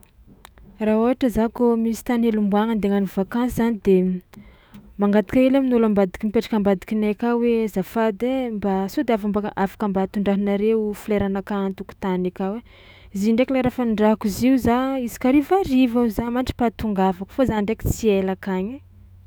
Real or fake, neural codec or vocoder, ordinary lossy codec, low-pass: fake; autoencoder, 48 kHz, 128 numbers a frame, DAC-VAE, trained on Japanese speech; none; none